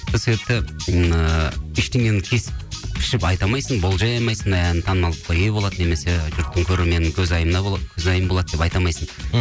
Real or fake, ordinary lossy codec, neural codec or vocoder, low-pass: real; none; none; none